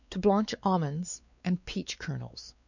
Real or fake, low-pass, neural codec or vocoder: fake; 7.2 kHz; codec, 16 kHz, 4 kbps, X-Codec, WavLM features, trained on Multilingual LibriSpeech